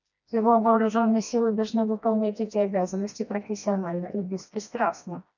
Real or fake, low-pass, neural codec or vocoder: fake; 7.2 kHz; codec, 16 kHz, 1 kbps, FreqCodec, smaller model